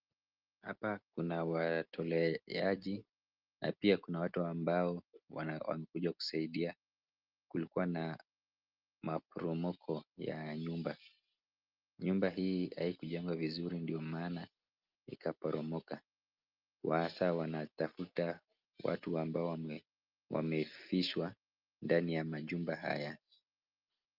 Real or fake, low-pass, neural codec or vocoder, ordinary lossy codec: real; 5.4 kHz; none; Opus, 24 kbps